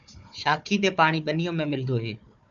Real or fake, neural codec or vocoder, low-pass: fake; codec, 16 kHz, 4 kbps, FunCodec, trained on Chinese and English, 50 frames a second; 7.2 kHz